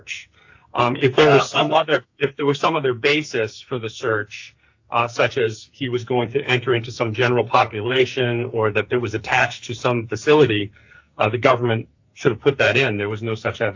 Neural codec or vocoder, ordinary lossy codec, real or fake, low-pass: codec, 44.1 kHz, 2.6 kbps, SNAC; AAC, 48 kbps; fake; 7.2 kHz